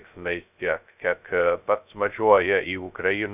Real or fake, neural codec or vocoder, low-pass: fake; codec, 16 kHz, 0.2 kbps, FocalCodec; 3.6 kHz